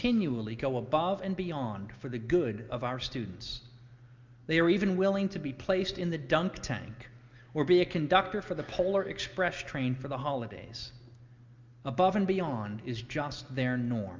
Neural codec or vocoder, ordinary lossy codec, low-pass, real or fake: none; Opus, 24 kbps; 7.2 kHz; real